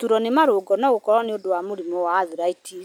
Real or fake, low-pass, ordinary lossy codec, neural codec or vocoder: real; none; none; none